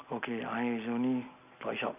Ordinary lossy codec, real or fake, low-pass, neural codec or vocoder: none; real; 3.6 kHz; none